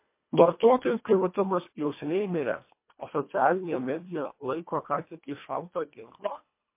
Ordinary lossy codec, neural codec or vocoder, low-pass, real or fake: MP3, 24 kbps; codec, 24 kHz, 1.5 kbps, HILCodec; 3.6 kHz; fake